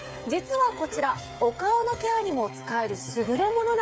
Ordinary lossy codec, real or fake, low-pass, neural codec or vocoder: none; fake; none; codec, 16 kHz, 8 kbps, FreqCodec, smaller model